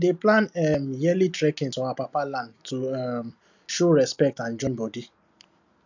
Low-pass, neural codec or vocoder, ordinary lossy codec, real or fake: 7.2 kHz; none; none; real